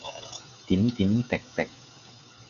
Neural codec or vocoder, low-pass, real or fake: codec, 16 kHz, 16 kbps, FreqCodec, smaller model; 7.2 kHz; fake